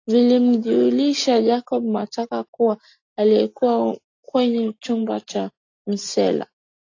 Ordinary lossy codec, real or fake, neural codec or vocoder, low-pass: AAC, 48 kbps; real; none; 7.2 kHz